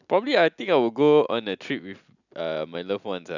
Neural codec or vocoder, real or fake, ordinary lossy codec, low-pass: none; real; none; 7.2 kHz